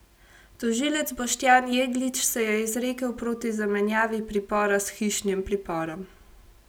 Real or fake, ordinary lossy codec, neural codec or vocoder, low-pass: real; none; none; none